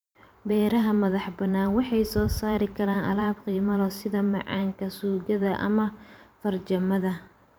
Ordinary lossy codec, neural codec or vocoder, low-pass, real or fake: none; vocoder, 44.1 kHz, 128 mel bands every 512 samples, BigVGAN v2; none; fake